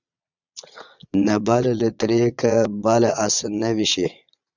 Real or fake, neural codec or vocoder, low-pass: fake; vocoder, 22.05 kHz, 80 mel bands, Vocos; 7.2 kHz